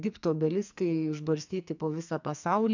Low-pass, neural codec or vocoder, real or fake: 7.2 kHz; codec, 44.1 kHz, 2.6 kbps, SNAC; fake